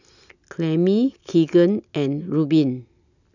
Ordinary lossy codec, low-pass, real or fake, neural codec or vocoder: none; 7.2 kHz; real; none